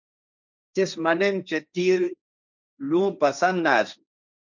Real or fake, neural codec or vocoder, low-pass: fake; codec, 16 kHz, 1.1 kbps, Voila-Tokenizer; 7.2 kHz